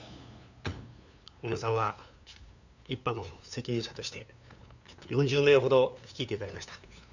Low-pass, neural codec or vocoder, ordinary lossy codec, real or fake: 7.2 kHz; codec, 16 kHz, 2 kbps, FunCodec, trained on LibriTTS, 25 frames a second; none; fake